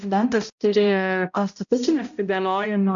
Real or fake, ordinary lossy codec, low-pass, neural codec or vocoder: fake; MP3, 64 kbps; 7.2 kHz; codec, 16 kHz, 0.5 kbps, X-Codec, HuBERT features, trained on balanced general audio